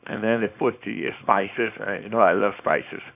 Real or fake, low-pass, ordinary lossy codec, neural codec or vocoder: fake; 3.6 kHz; none; codec, 24 kHz, 0.9 kbps, WavTokenizer, small release